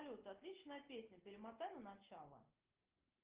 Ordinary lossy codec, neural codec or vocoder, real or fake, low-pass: Opus, 16 kbps; none; real; 3.6 kHz